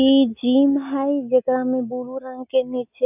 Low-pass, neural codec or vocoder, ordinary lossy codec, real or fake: 3.6 kHz; none; none; real